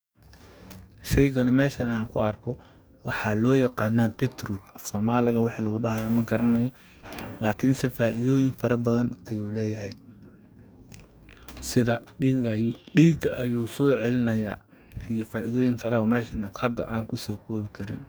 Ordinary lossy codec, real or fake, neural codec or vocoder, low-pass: none; fake; codec, 44.1 kHz, 2.6 kbps, DAC; none